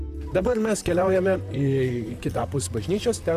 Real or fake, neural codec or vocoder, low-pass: fake; vocoder, 44.1 kHz, 128 mel bands, Pupu-Vocoder; 14.4 kHz